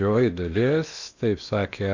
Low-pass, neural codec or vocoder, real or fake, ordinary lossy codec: 7.2 kHz; codec, 16 kHz in and 24 kHz out, 0.8 kbps, FocalCodec, streaming, 65536 codes; fake; Opus, 64 kbps